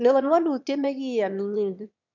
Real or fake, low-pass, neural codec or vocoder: fake; 7.2 kHz; autoencoder, 22.05 kHz, a latent of 192 numbers a frame, VITS, trained on one speaker